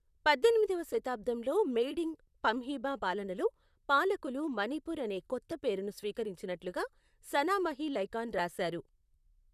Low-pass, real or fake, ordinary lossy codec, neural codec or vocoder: 14.4 kHz; fake; none; vocoder, 44.1 kHz, 128 mel bands, Pupu-Vocoder